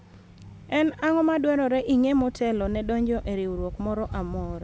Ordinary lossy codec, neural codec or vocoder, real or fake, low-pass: none; none; real; none